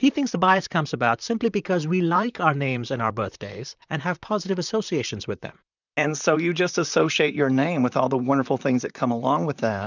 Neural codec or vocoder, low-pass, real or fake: vocoder, 44.1 kHz, 128 mel bands, Pupu-Vocoder; 7.2 kHz; fake